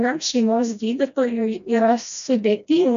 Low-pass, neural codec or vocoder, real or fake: 7.2 kHz; codec, 16 kHz, 1 kbps, FreqCodec, smaller model; fake